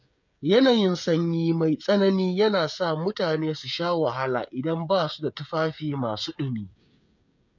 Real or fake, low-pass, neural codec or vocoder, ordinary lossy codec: fake; 7.2 kHz; codec, 16 kHz, 16 kbps, FreqCodec, smaller model; none